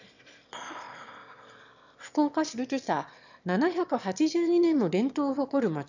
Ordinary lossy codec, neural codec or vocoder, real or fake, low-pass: none; autoencoder, 22.05 kHz, a latent of 192 numbers a frame, VITS, trained on one speaker; fake; 7.2 kHz